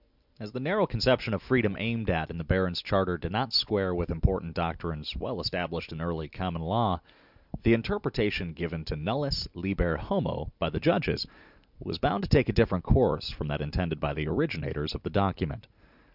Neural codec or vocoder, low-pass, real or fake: none; 5.4 kHz; real